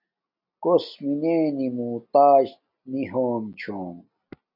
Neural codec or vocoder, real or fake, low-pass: none; real; 5.4 kHz